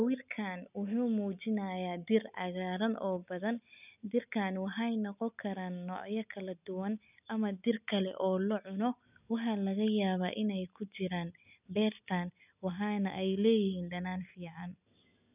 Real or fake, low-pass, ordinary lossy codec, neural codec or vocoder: real; 3.6 kHz; none; none